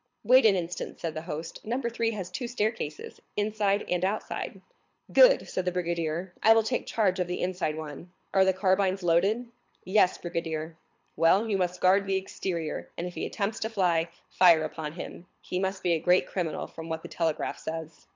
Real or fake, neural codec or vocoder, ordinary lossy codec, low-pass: fake; codec, 24 kHz, 6 kbps, HILCodec; MP3, 64 kbps; 7.2 kHz